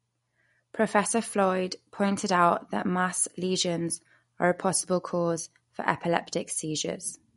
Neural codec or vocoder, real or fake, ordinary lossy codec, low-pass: none; real; MP3, 48 kbps; 19.8 kHz